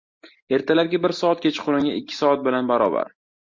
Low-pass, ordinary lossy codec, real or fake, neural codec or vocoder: 7.2 kHz; MP3, 48 kbps; real; none